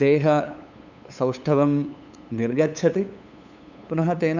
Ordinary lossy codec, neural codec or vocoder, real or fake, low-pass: none; codec, 16 kHz, 8 kbps, FunCodec, trained on LibriTTS, 25 frames a second; fake; 7.2 kHz